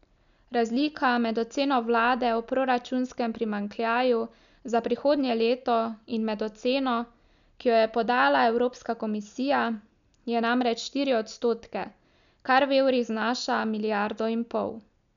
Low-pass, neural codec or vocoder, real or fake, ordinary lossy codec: 7.2 kHz; none; real; none